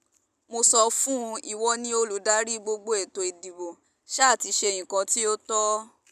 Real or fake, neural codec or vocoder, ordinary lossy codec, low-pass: real; none; none; 14.4 kHz